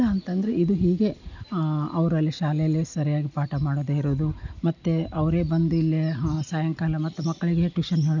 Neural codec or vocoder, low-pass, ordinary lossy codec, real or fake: none; 7.2 kHz; none; real